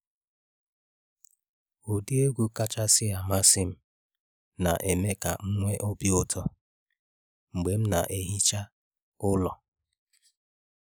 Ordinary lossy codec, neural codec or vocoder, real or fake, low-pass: none; none; real; none